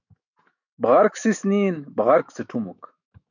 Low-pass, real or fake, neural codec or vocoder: 7.2 kHz; fake; autoencoder, 48 kHz, 128 numbers a frame, DAC-VAE, trained on Japanese speech